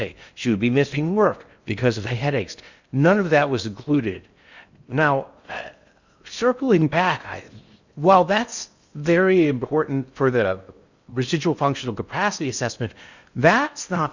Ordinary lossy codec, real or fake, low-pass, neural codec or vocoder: Opus, 64 kbps; fake; 7.2 kHz; codec, 16 kHz in and 24 kHz out, 0.6 kbps, FocalCodec, streaming, 4096 codes